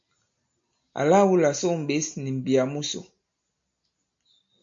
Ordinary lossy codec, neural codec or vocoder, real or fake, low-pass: MP3, 48 kbps; none; real; 7.2 kHz